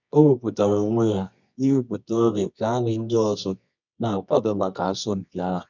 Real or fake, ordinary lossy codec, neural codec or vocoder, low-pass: fake; none; codec, 24 kHz, 0.9 kbps, WavTokenizer, medium music audio release; 7.2 kHz